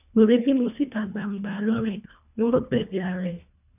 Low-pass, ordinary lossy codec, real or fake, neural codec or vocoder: 3.6 kHz; none; fake; codec, 24 kHz, 1.5 kbps, HILCodec